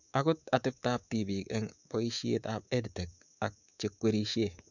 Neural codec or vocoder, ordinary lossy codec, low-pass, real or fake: codec, 24 kHz, 3.1 kbps, DualCodec; none; 7.2 kHz; fake